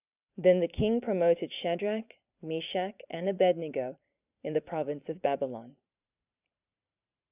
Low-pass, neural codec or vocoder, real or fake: 3.6 kHz; vocoder, 44.1 kHz, 128 mel bands every 512 samples, BigVGAN v2; fake